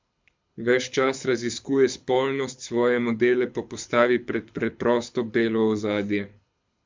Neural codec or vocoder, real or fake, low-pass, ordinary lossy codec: codec, 24 kHz, 6 kbps, HILCodec; fake; 7.2 kHz; MP3, 64 kbps